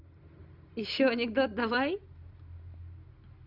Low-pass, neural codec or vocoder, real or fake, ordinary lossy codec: 5.4 kHz; none; real; Opus, 64 kbps